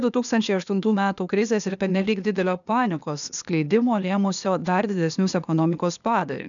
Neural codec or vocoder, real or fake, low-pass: codec, 16 kHz, 0.8 kbps, ZipCodec; fake; 7.2 kHz